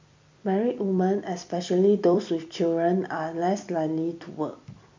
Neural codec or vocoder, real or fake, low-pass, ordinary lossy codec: vocoder, 44.1 kHz, 128 mel bands every 256 samples, BigVGAN v2; fake; 7.2 kHz; MP3, 64 kbps